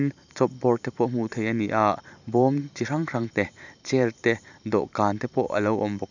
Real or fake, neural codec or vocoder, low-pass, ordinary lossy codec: fake; vocoder, 44.1 kHz, 128 mel bands every 512 samples, BigVGAN v2; 7.2 kHz; none